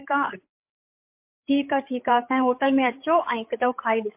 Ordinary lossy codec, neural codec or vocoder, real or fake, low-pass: MP3, 32 kbps; codec, 16 kHz, 8 kbps, FreqCodec, larger model; fake; 3.6 kHz